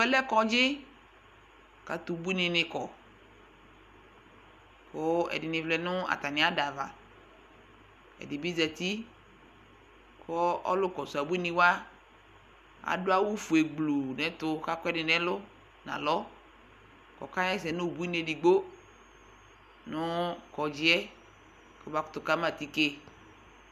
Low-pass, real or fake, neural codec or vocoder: 14.4 kHz; real; none